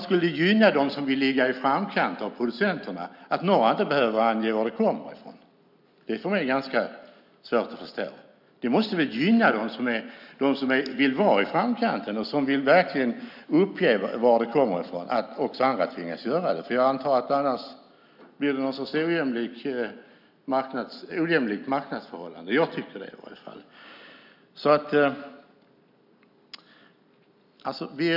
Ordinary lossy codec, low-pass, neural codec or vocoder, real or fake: none; 5.4 kHz; none; real